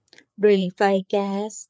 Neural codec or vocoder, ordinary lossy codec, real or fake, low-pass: codec, 16 kHz, 4 kbps, FreqCodec, larger model; none; fake; none